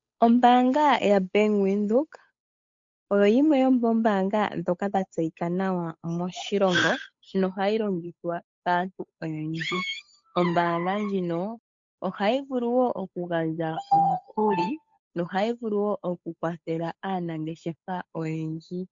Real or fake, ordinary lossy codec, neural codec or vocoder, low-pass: fake; MP3, 48 kbps; codec, 16 kHz, 8 kbps, FunCodec, trained on Chinese and English, 25 frames a second; 7.2 kHz